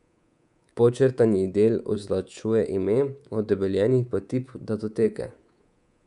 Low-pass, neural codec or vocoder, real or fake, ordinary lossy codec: 10.8 kHz; codec, 24 kHz, 3.1 kbps, DualCodec; fake; none